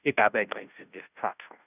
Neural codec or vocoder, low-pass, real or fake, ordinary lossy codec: codec, 16 kHz, 0.5 kbps, FunCodec, trained on Chinese and English, 25 frames a second; 3.6 kHz; fake; none